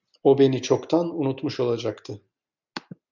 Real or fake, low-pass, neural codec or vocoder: real; 7.2 kHz; none